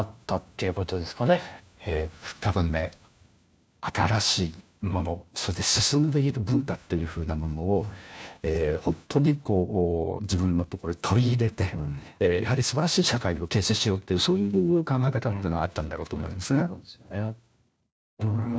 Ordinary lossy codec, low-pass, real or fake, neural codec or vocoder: none; none; fake; codec, 16 kHz, 1 kbps, FunCodec, trained on LibriTTS, 50 frames a second